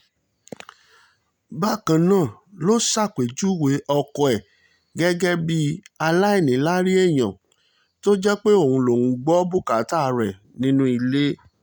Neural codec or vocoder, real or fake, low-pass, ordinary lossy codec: none; real; none; none